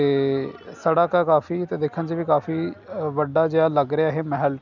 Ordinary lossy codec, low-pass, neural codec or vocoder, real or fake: none; 7.2 kHz; none; real